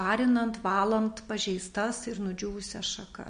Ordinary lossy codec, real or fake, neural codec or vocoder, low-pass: MP3, 48 kbps; real; none; 9.9 kHz